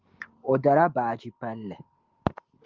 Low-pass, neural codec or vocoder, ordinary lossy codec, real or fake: 7.2 kHz; none; Opus, 32 kbps; real